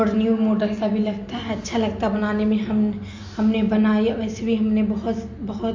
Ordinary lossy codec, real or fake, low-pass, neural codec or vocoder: MP3, 48 kbps; real; 7.2 kHz; none